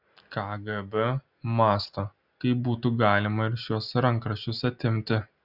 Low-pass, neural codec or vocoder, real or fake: 5.4 kHz; none; real